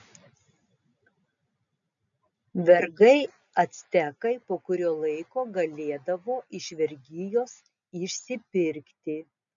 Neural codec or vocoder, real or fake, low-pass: none; real; 7.2 kHz